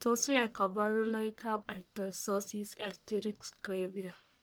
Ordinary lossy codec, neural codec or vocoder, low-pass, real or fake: none; codec, 44.1 kHz, 1.7 kbps, Pupu-Codec; none; fake